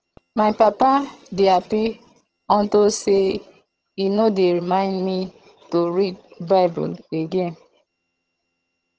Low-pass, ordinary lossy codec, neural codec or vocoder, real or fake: 7.2 kHz; Opus, 16 kbps; vocoder, 22.05 kHz, 80 mel bands, HiFi-GAN; fake